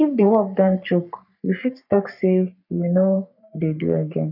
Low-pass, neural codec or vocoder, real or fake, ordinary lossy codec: 5.4 kHz; codec, 44.1 kHz, 3.4 kbps, Pupu-Codec; fake; none